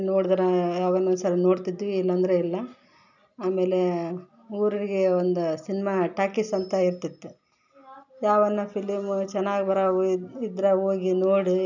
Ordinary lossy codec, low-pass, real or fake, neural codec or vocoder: none; 7.2 kHz; real; none